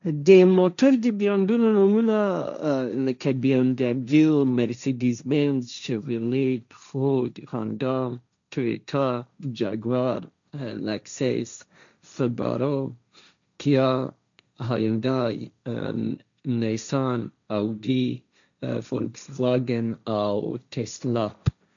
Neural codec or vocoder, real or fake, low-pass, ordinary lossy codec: codec, 16 kHz, 1.1 kbps, Voila-Tokenizer; fake; 7.2 kHz; MP3, 96 kbps